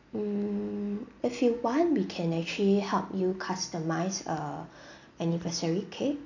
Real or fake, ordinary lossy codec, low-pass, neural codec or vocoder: real; none; 7.2 kHz; none